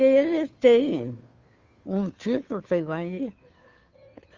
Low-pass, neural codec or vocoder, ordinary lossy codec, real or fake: 7.2 kHz; codec, 16 kHz, 2 kbps, FunCodec, trained on Chinese and English, 25 frames a second; Opus, 32 kbps; fake